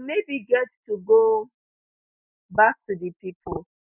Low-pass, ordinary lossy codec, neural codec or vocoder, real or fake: 3.6 kHz; none; none; real